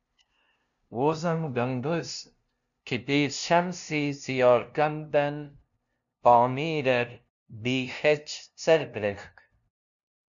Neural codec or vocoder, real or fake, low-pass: codec, 16 kHz, 0.5 kbps, FunCodec, trained on LibriTTS, 25 frames a second; fake; 7.2 kHz